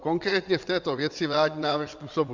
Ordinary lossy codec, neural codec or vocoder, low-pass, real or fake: MP3, 64 kbps; vocoder, 22.05 kHz, 80 mel bands, WaveNeXt; 7.2 kHz; fake